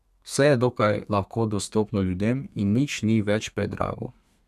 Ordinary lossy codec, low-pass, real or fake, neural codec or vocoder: none; 14.4 kHz; fake; codec, 32 kHz, 1.9 kbps, SNAC